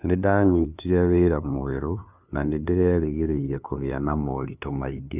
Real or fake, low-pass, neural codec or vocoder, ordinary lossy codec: fake; 3.6 kHz; codec, 16 kHz, 2 kbps, FunCodec, trained on LibriTTS, 25 frames a second; none